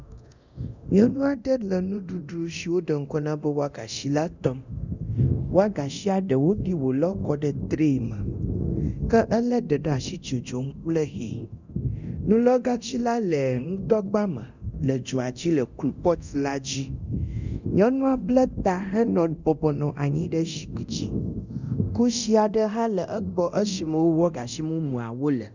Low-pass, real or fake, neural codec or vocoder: 7.2 kHz; fake; codec, 24 kHz, 0.9 kbps, DualCodec